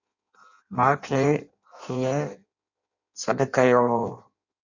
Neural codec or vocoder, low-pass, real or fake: codec, 16 kHz in and 24 kHz out, 0.6 kbps, FireRedTTS-2 codec; 7.2 kHz; fake